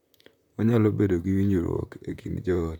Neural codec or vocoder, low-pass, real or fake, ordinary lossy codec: vocoder, 44.1 kHz, 128 mel bands, Pupu-Vocoder; 19.8 kHz; fake; none